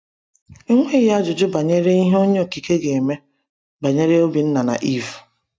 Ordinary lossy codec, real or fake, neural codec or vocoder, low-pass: none; real; none; none